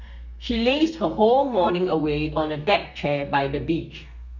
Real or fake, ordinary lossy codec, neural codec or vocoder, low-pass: fake; none; codec, 32 kHz, 1.9 kbps, SNAC; 7.2 kHz